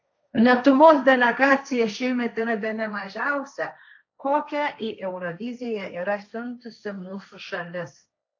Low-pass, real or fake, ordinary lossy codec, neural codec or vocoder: 7.2 kHz; fake; AAC, 48 kbps; codec, 16 kHz, 1.1 kbps, Voila-Tokenizer